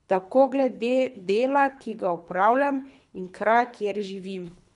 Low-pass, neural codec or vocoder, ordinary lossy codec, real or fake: 10.8 kHz; codec, 24 kHz, 3 kbps, HILCodec; none; fake